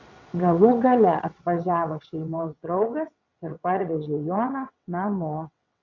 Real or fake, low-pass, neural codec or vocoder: fake; 7.2 kHz; vocoder, 22.05 kHz, 80 mel bands, WaveNeXt